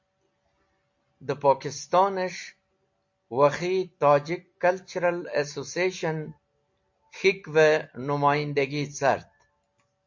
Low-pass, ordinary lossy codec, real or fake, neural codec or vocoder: 7.2 kHz; MP3, 48 kbps; real; none